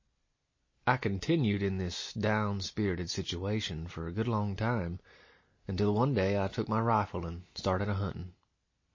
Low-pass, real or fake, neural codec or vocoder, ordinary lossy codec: 7.2 kHz; real; none; MP3, 32 kbps